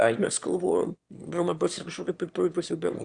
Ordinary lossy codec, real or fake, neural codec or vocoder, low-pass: Opus, 64 kbps; fake; autoencoder, 22.05 kHz, a latent of 192 numbers a frame, VITS, trained on one speaker; 9.9 kHz